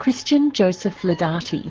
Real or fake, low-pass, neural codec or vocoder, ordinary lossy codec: fake; 7.2 kHz; codec, 44.1 kHz, 7.8 kbps, Pupu-Codec; Opus, 16 kbps